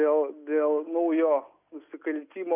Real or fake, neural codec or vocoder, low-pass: real; none; 3.6 kHz